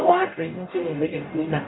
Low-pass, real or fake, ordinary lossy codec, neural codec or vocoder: 7.2 kHz; fake; AAC, 16 kbps; codec, 44.1 kHz, 0.9 kbps, DAC